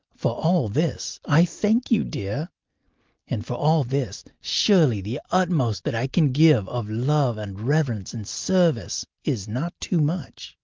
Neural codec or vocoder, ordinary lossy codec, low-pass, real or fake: none; Opus, 24 kbps; 7.2 kHz; real